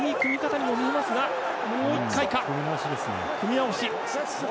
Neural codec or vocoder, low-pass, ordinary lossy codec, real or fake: none; none; none; real